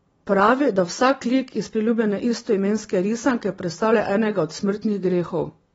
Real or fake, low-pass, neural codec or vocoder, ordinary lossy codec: real; 19.8 kHz; none; AAC, 24 kbps